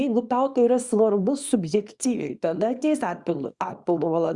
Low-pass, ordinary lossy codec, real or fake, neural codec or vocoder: 10.8 kHz; Opus, 64 kbps; fake; codec, 24 kHz, 0.9 kbps, WavTokenizer, small release